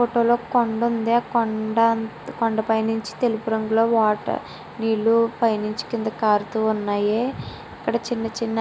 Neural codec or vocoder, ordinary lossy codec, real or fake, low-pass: none; none; real; none